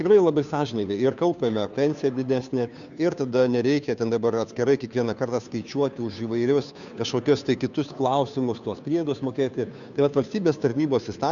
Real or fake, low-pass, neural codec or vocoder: fake; 7.2 kHz; codec, 16 kHz, 2 kbps, FunCodec, trained on Chinese and English, 25 frames a second